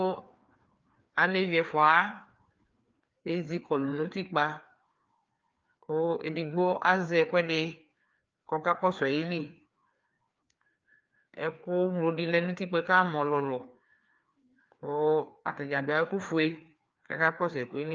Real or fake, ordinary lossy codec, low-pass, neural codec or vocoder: fake; Opus, 24 kbps; 7.2 kHz; codec, 16 kHz, 2 kbps, FreqCodec, larger model